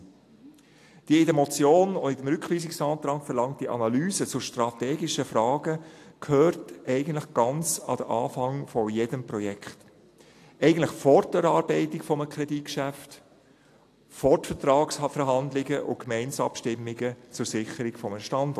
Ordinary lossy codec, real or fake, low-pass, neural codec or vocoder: AAC, 64 kbps; real; 14.4 kHz; none